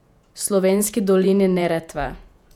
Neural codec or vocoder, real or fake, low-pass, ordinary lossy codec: vocoder, 48 kHz, 128 mel bands, Vocos; fake; 19.8 kHz; none